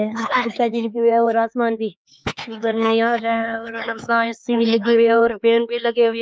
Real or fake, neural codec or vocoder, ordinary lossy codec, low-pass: fake; codec, 16 kHz, 4 kbps, X-Codec, HuBERT features, trained on LibriSpeech; none; none